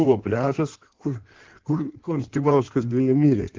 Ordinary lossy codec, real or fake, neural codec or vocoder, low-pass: Opus, 16 kbps; fake; codec, 16 kHz in and 24 kHz out, 1.1 kbps, FireRedTTS-2 codec; 7.2 kHz